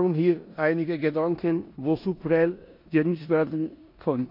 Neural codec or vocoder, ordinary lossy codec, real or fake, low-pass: codec, 16 kHz in and 24 kHz out, 0.9 kbps, LongCat-Audio-Codec, four codebook decoder; MP3, 32 kbps; fake; 5.4 kHz